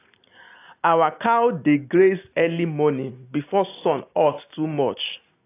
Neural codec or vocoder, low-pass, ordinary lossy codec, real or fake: none; 3.6 kHz; AAC, 24 kbps; real